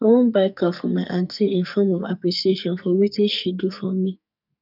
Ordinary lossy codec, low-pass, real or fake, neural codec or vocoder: none; 5.4 kHz; fake; codec, 44.1 kHz, 2.6 kbps, SNAC